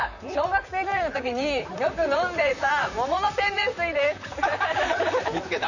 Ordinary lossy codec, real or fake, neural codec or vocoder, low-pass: none; fake; vocoder, 44.1 kHz, 128 mel bands, Pupu-Vocoder; 7.2 kHz